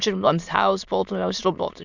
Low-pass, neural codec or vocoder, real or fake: 7.2 kHz; autoencoder, 22.05 kHz, a latent of 192 numbers a frame, VITS, trained on many speakers; fake